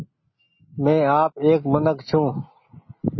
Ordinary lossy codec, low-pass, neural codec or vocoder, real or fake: MP3, 24 kbps; 7.2 kHz; none; real